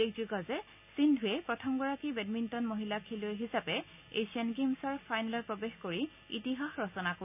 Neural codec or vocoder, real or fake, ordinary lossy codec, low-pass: none; real; none; 3.6 kHz